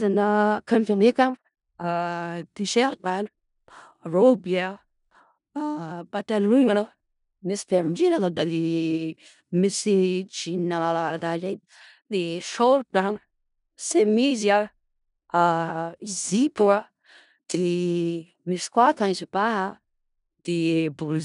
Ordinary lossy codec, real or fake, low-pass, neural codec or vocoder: none; fake; 10.8 kHz; codec, 16 kHz in and 24 kHz out, 0.4 kbps, LongCat-Audio-Codec, four codebook decoder